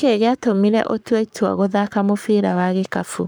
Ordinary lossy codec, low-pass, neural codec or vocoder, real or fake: none; none; codec, 44.1 kHz, 7.8 kbps, Pupu-Codec; fake